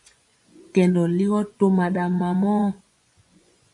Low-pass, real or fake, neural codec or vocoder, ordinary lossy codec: 10.8 kHz; fake; vocoder, 24 kHz, 100 mel bands, Vocos; MP3, 64 kbps